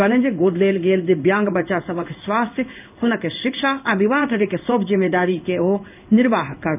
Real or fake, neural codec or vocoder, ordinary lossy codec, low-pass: fake; codec, 16 kHz in and 24 kHz out, 1 kbps, XY-Tokenizer; none; 3.6 kHz